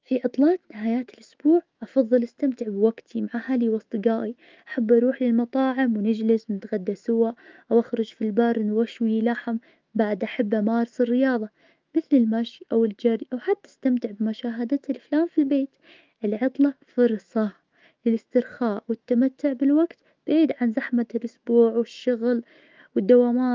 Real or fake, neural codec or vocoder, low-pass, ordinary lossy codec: fake; autoencoder, 48 kHz, 128 numbers a frame, DAC-VAE, trained on Japanese speech; 7.2 kHz; Opus, 32 kbps